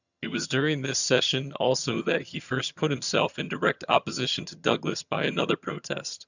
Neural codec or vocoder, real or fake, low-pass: vocoder, 22.05 kHz, 80 mel bands, HiFi-GAN; fake; 7.2 kHz